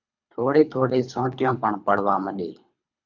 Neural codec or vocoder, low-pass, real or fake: codec, 24 kHz, 3 kbps, HILCodec; 7.2 kHz; fake